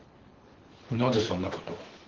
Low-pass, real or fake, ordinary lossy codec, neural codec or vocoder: 7.2 kHz; fake; Opus, 16 kbps; codec, 24 kHz, 6 kbps, HILCodec